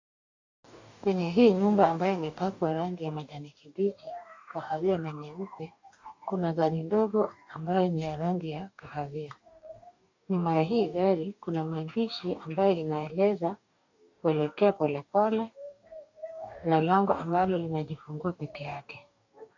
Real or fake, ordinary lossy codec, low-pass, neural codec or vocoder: fake; AAC, 48 kbps; 7.2 kHz; codec, 44.1 kHz, 2.6 kbps, DAC